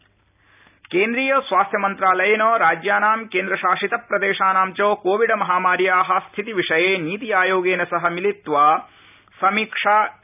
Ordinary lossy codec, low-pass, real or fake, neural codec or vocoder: none; 3.6 kHz; real; none